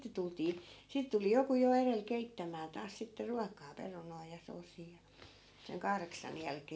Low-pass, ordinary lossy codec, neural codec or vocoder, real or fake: none; none; none; real